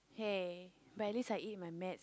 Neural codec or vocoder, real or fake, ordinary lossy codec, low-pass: none; real; none; none